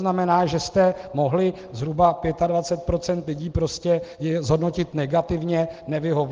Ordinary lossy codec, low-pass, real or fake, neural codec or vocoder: Opus, 16 kbps; 7.2 kHz; real; none